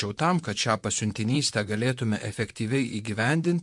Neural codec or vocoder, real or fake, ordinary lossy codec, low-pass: vocoder, 44.1 kHz, 128 mel bands, Pupu-Vocoder; fake; MP3, 64 kbps; 10.8 kHz